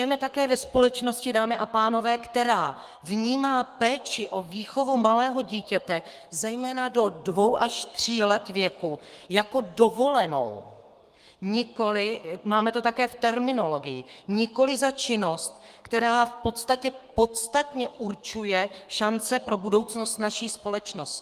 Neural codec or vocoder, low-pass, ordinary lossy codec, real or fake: codec, 32 kHz, 1.9 kbps, SNAC; 14.4 kHz; Opus, 32 kbps; fake